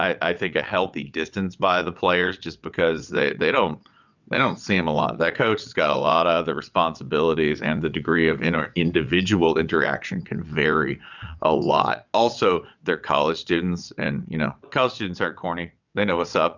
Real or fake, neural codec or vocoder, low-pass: fake; vocoder, 22.05 kHz, 80 mel bands, WaveNeXt; 7.2 kHz